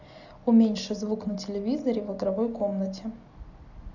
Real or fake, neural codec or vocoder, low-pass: real; none; 7.2 kHz